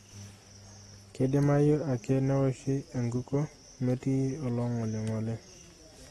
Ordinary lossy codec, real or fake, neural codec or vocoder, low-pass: AAC, 32 kbps; real; none; 19.8 kHz